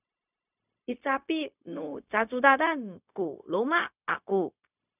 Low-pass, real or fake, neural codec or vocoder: 3.6 kHz; fake; codec, 16 kHz, 0.4 kbps, LongCat-Audio-Codec